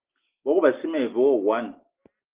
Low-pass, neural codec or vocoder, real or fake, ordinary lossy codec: 3.6 kHz; none; real; Opus, 32 kbps